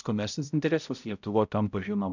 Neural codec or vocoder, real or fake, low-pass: codec, 16 kHz, 0.5 kbps, X-Codec, HuBERT features, trained on balanced general audio; fake; 7.2 kHz